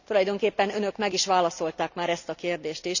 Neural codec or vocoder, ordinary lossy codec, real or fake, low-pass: none; none; real; 7.2 kHz